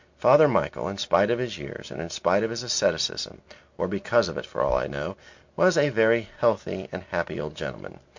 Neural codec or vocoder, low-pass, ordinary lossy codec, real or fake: none; 7.2 kHz; MP3, 48 kbps; real